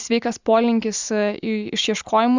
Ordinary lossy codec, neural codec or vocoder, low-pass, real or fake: Opus, 64 kbps; none; 7.2 kHz; real